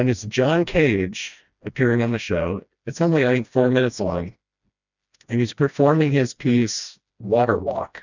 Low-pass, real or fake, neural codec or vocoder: 7.2 kHz; fake; codec, 16 kHz, 1 kbps, FreqCodec, smaller model